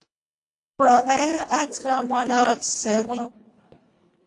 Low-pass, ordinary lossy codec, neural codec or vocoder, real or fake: 10.8 kHz; AAC, 48 kbps; codec, 24 kHz, 1.5 kbps, HILCodec; fake